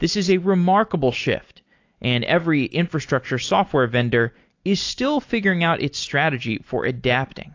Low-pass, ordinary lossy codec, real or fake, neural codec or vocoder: 7.2 kHz; AAC, 48 kbps; real; none